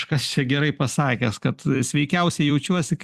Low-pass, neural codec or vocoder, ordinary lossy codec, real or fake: 14.4 kHz; vocoder, 44.1 kHz, 128 mel bands every 256 samples, BigVGAN v2; Opus, 64 kbps; fake